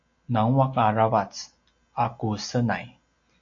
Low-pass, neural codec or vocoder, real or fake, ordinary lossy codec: 7.2 kHz; none; real; AAC, 48 kbps